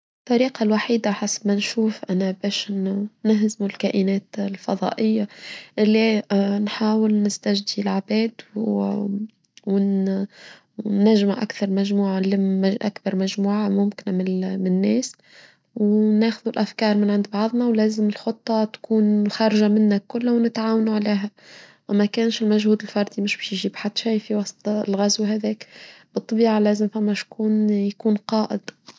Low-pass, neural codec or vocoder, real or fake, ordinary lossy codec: none; none; real; none